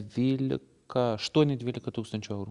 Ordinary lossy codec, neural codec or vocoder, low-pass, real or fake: Opus, 64 kbps; none; 10.8 kHz; real